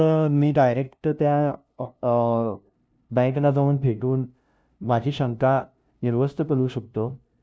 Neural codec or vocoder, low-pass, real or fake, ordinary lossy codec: codec, 16 kHz, 0.5 kbps, FunCodec, trained on LibriTTS, 25 frames a second; none; fake; none